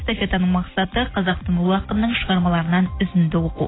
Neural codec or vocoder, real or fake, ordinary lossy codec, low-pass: none; real; AAC, 16 kbps; 7.2 kHz